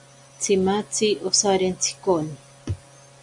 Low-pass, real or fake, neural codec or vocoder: 10.8 kHz; real; none